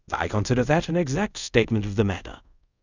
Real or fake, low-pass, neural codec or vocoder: fake; 7.2 kHz; codec, 24 kHz, 0.5 kbps, DualCodec